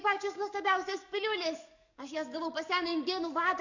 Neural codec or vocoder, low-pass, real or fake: codec, 44.1 kHz, 7.8 kbps, DAC; 7.2 kHz; fake